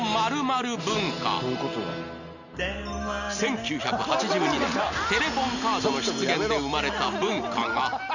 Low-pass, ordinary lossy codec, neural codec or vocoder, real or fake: 7.2 kHz; none; none; real